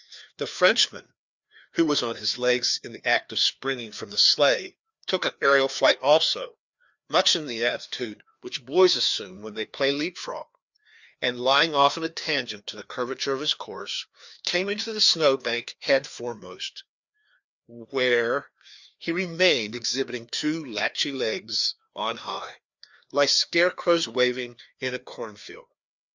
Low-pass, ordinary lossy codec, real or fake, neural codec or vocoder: 7.2 kHz; Opus, 64 kbps; fake; codec, 16 kHz, 2 kbps, FreqCodec, larger model